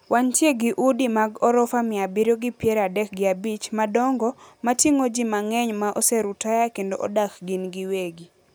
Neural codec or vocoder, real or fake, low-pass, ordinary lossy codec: none; real; none; none